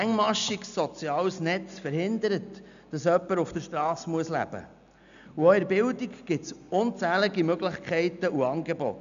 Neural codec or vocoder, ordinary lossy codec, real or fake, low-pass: none; none; real; 7.2 kHz